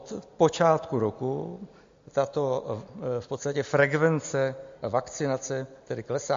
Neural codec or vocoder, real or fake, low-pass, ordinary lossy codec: none; real; 7.2 kHz; MP3, 48 kbps